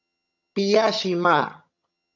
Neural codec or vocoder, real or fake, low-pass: vocoder, 22.05 kHz, 80 mel bands, HiFi-GAN; fake; 7.2 kHz